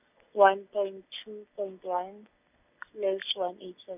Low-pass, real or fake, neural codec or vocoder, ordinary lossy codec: 3.6 kHz; real; none; none